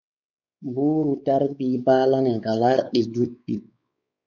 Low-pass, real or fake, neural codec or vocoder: 7.2 kHz; fake; codec, 16 kHz, 4 kbps, X-Codec, WavLM features, trained on Multilingual LibriSpeech